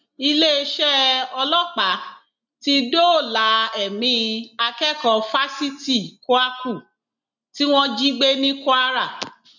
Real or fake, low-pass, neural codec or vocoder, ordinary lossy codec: real; 7.2 kHz; none; none